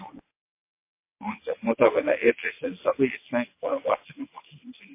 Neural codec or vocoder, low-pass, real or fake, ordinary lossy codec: vocoder, 22.05 kHz, 80 mel bands, WaveNeXt; 3.6 kHz; fake; MP3, 24 kbps